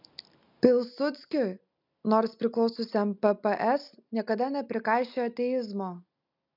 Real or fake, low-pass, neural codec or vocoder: real; 5.4 kHz; none